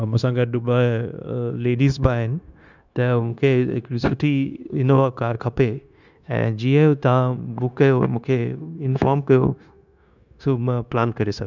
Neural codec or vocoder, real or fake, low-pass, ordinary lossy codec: codec, 16 kHz, 0.9 kbps, LongCat-Audio-Codec; fake; 7.2 kHz; none